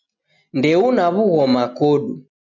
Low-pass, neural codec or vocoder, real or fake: 7.2 kHz; none; real